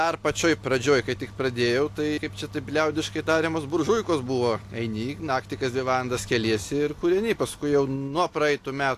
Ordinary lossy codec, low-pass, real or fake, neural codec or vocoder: AAC, 64 kbps; 14.4 kHz; real; none